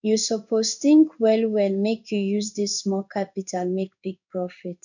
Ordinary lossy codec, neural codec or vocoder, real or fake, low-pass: none; codec, 16 kHz in and 24 kHz out, 1 kbps, XY-Tokenizer; fake; 7.2 kHz